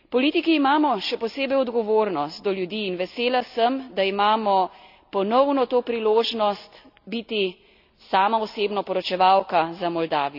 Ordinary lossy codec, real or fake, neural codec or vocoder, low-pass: none; real; none; 5.4 kHz